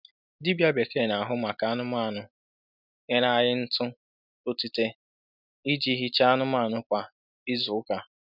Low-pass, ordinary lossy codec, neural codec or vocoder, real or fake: 5.4 kHz; none; none; real